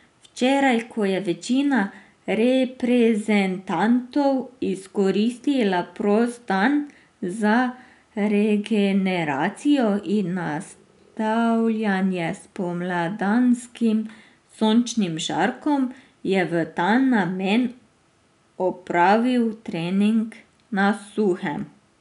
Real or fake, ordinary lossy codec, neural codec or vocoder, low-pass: real; none; none; 10.8 kHz